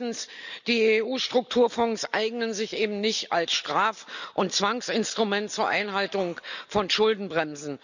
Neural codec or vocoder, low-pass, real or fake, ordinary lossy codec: none; 7.2 kHz; real; none